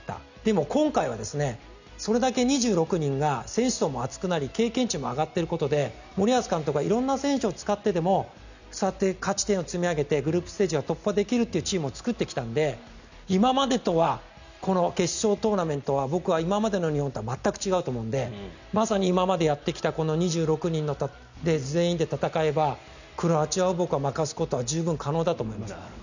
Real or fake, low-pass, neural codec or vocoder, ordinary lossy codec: real; 7.2 kHz; none; none